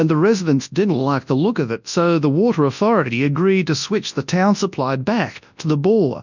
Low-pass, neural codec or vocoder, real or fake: 7.2 kHz; codec, 24 kHz, 0.9 kbps, WavTokenizer, large speech release; fake